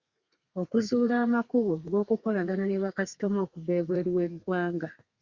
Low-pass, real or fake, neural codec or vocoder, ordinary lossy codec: 7.2 kHz; fake; codec, 32 kHz, 1.9 kbps, SNAC; Opus, 64 kbps